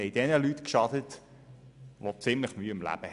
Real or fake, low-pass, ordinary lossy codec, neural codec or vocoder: real; 10.8 kHz; none; none